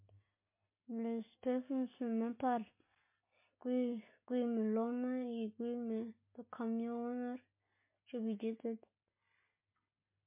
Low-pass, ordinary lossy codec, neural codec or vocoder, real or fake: 3.6 kHz; MP3, 24 kbps; none; real